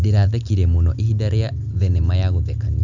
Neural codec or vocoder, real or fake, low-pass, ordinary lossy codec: none; real; 7.2 kHz; none